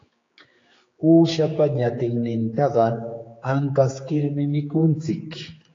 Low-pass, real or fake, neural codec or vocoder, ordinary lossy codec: 7.2 kHz; fake; codec, 16 kHz, 4 kbps, X-Codec, HuBERT features, trained on general audio; AAC, 32 kbps